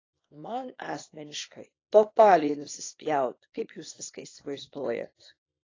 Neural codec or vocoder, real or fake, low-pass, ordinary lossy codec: codec, 24 kHz, 0.9 kbps, WavTokenizer, small release; fake; 7.2 kHz; AAC, 32 kbps